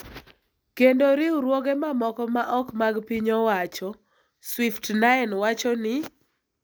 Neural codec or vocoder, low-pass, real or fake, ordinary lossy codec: none; none; real; none